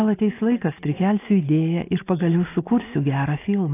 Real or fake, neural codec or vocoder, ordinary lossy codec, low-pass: real; none; AAC, 24 kbps; 3.6 kHz